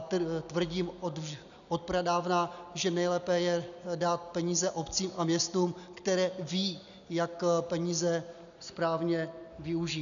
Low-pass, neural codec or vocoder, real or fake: 7.2 kHz; none; real